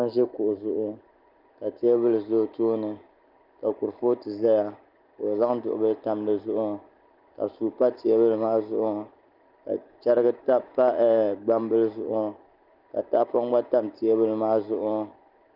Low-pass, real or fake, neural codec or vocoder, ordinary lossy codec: 5.4 kHz; real; none; Opus, 24 kbps